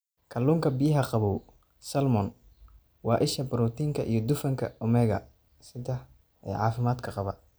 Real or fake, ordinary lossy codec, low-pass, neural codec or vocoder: real; none; none; none